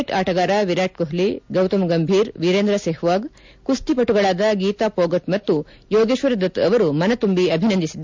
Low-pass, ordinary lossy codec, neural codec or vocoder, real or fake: 7.2 kHz; MP3, 64 kbps; none; real